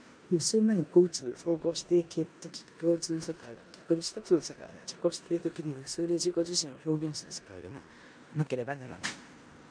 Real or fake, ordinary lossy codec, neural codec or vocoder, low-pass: fake; MP3, 96 kbps; codec, 16 kHz in and 24 kHz out, 0.9 kbps, LongCat-Audio-Codec, four codebook decoder; 9.9 kHz